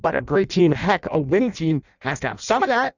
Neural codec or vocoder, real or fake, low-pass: codec, 16 kHz in and 24 kHz out, 0.6 kbps, FireRedTTS-2 codec; fake; 7.2 kHz